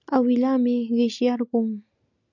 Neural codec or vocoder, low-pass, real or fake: none; 7.2 kHz; real